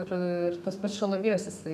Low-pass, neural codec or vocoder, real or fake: 14.4 kHz; codec, 32 kHz, 1.9 kbps, SNAC; fake